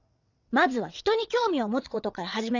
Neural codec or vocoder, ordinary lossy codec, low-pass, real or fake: vocoder, 22.05 kHz, 80 mel bands, WaveNeXt; none; 7.2 kHz; fake